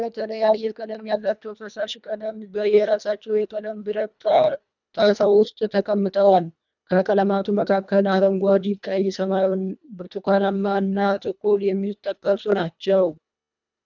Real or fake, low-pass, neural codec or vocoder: fake; 7.2 kHz; codec, 24 kHz, 1.5 kbps, HILCodec